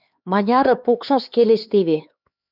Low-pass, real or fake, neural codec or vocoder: 5.4 kHz; fake; codec, 16 kHz, 4 kbps, X-Codec, HuBERT features, trained on LibriSpeech